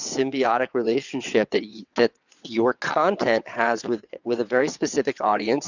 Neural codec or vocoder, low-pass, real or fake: vocoder, 22.05 kHz, 80 mel bands, WaveNeXt; 7.2 kHz; fake